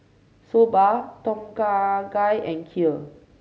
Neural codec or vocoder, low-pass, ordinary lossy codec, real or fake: none; none; none; real